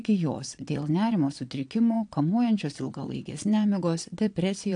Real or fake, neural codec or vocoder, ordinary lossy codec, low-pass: fake; vocoder, 22.05 kHz, 80 mel bands, Vocos; AAC, 64 kbps; 9.9 kHz